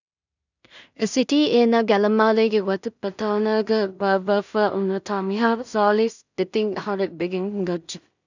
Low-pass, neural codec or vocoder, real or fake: 7.2 kHz; codec, 16 kHz in and 24 kHz out, 0.4 kbps, LongCat-Audio-Codec, two codebook decoder; fake